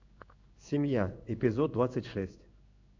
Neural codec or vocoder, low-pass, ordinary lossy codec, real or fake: codec, 16 kHz in and 24 kHz out, 1 kbps, XY-Tokenizer; 7.2 kHz; MP3, 64 kbps; fake